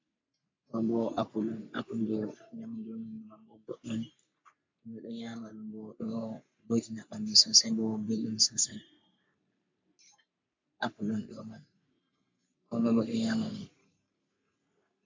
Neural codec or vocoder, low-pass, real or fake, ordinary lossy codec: codec, 44.1 kHz, 3.4 kbps, Pupu-Codec; 7.2 kHz; fake; MP3, 64 kbps